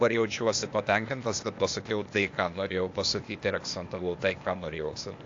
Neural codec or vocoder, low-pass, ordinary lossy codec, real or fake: codec, 16 kHz, 0.8 kbps, ZipCodec; 7.2 kHz; AAC, 48 kbps; fake